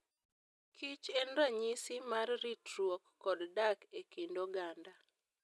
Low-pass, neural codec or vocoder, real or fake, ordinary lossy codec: none; none; real; none